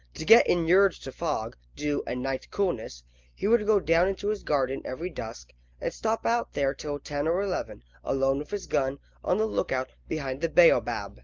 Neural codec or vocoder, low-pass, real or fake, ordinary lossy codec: none; 7.2 kHz; real; Opus, 32 kbps